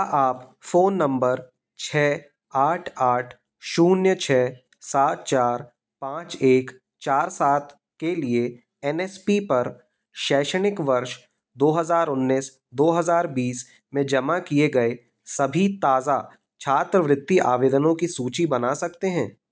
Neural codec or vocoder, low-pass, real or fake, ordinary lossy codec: none; none; real; none